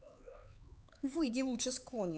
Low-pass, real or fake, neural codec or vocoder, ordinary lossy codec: none; fake; codec, 16 kHz, 4 kbps, X-Codec, HuBERT features, trained on LibriSpeech; none